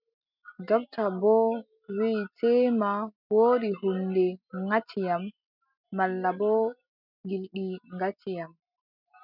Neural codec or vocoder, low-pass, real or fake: none; 5.4 kHz; real